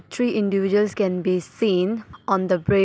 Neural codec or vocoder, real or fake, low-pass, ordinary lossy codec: none; real; none; none